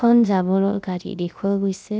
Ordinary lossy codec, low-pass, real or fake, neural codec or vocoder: none; none; fake; codec, 16 kHz, 0.3 kbps, FocalCodec